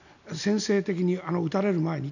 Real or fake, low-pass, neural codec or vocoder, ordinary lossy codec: real; 7.2 kHz; none; none